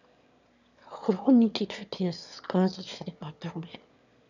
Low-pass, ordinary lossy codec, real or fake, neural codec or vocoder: 7.2 kHz; none; fake; autoencoder, 22.05 kHz, a latent of 192 numbers a frame, VITS, trained on one speaker